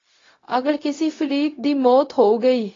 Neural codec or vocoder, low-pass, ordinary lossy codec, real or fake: codec, 16 kHz, 0.4 kbps, LongCat-Audio-Codec; 7.2 kHz; AAC, 32 kbps; fake